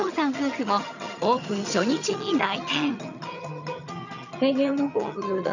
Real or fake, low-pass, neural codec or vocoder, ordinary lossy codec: fake; 7.2 kHz; vocoder, 22.05 kHz, 80 mel bands, HiFi-GAN; none